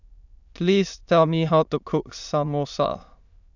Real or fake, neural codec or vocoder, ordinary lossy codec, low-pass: fake; autoencoder, 22.05 kHz, a latent of 192 numbers a frame, VITS, trained on many speakers; none; 7.2 kHz